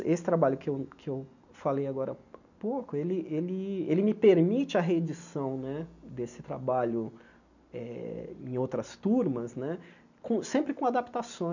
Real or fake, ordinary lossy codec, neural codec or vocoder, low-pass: real; none; none; 7.2 kHz